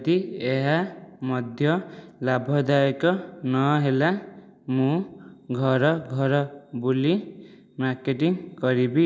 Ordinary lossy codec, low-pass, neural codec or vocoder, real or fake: none; none; none; real